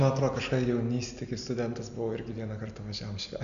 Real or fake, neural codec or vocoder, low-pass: real; none; 7.2 kHz